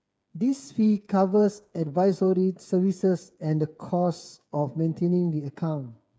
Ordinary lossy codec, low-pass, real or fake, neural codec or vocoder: none; none; fake; codec, 16 kHz, 8 kbps, FreqCodec, smaller model